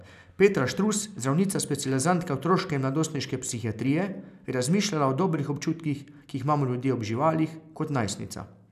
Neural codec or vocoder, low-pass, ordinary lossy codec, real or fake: none; 14.4 kHz; none; real